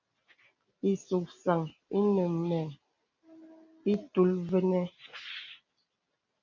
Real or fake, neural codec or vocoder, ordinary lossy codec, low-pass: real; none; MP3, 48 kbps; 7.2 kHz